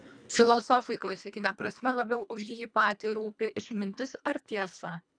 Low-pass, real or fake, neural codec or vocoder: 9.9 kHz; fake; codec, 24 kHz, 1.5 kbps, HILCodec